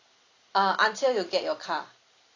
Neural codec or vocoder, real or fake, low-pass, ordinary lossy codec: none; real; 7.2 kHz; AAC, 32 kbps